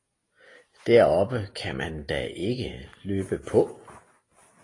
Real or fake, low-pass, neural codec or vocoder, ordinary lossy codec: real; 10.8 kHz; none; AAC, 32 kbps